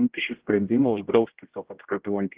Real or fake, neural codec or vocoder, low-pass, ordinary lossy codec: fake; codec, 16 kHz, 0.5 kbps, X-Codec, HuBERT features, trained on general audio; 3.6 kHz; Opus, 16 kbps